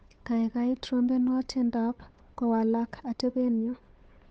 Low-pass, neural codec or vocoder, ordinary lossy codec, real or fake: none; codec, 16 kHz, 8 kbps, FunCodec, trained on Chinese and English, 25 frames a second; none; fake